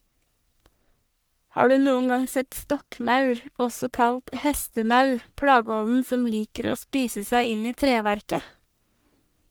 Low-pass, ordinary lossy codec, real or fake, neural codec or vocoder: none; none; fake; codec, 44.1 kHz, 1.7 kbps, Pupu-Codec